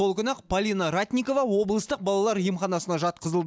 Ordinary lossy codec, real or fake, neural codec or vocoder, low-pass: none; real; none; none